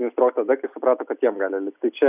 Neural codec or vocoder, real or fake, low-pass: none; real; 3.6 kHz